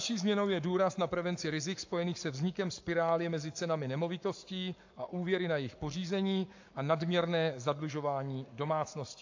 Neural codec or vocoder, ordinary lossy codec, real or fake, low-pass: codec, 44.1 kHz, 7.8 kbps, Pupu-Codec; AAC, 48 kbps; fake; 7.2 kHz